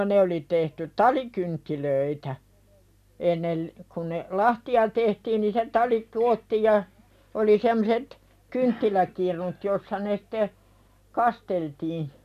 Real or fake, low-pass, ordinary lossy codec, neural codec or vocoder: real; 14.4 kHz; none; none